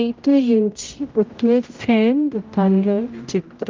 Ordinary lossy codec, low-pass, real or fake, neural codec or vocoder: Opus, 24 kbps; 7.2 kHz; fake; codec, 16 kHz, 0.5 kbps, X-Codec, HuBERT features, trained on general audio